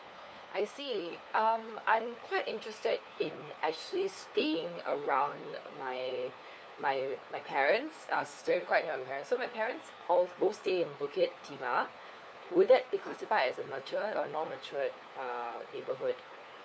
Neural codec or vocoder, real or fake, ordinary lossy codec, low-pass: codec, 16 kHz, 4 kbps, FunCodec, trained on LibriTTS, 50 frames a second; fake; none; none